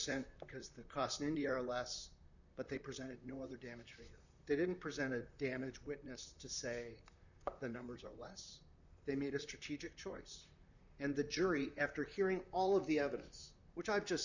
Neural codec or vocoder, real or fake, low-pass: vocoder, 44.1 kHz, 128 mel bands, Pupu-Vocoder; fake; 7.2 kHz